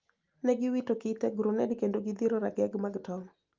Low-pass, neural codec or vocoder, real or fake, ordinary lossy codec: 7.2 kHz; none; real; Opus, 32 kbps